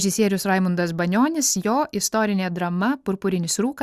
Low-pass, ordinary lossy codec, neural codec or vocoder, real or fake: 14.4 kHz; Opus, 64 kbps; none; real